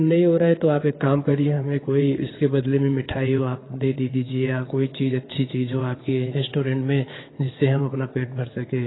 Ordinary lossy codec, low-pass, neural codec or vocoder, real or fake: AAC, 16 kbps; 7.2 kHz; vocoder, 22.05 kHz, 80 mel bands, WaveNeXt; fake